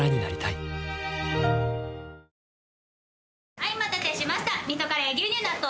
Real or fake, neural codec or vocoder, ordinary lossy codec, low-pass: real; none; none; none